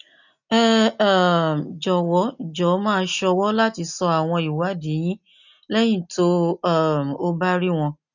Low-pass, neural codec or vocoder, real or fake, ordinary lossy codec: 7.2 kHz; none; real; none